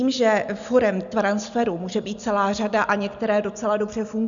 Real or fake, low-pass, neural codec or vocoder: real; 7.2 kHz; none